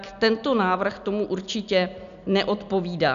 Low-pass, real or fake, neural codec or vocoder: 7.2 kHz; real; none